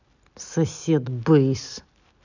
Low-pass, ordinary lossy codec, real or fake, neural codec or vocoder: 7.2 kHz; none; real; none